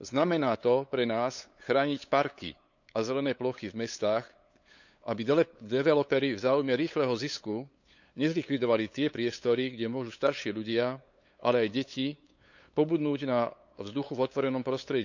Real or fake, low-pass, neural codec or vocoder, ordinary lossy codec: fake; 7.2 kHz; codec, 16 kHz, 8 kbps, FunCodec, trained on LibriTTS, 25 frames a second; none